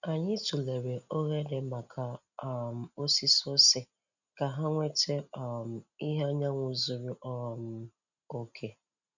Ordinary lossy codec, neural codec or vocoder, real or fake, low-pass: none; none; real; 7.2 kHz